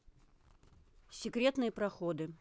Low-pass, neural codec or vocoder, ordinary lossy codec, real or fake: none; none; none; real